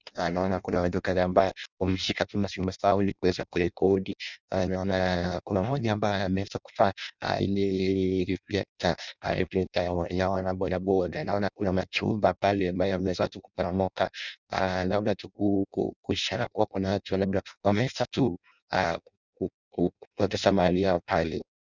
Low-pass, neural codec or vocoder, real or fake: 7.2 kHz; codec, 16 kHz in and 24 kHz out, 0.6 kbps, FireRedTTS-2 codec; fake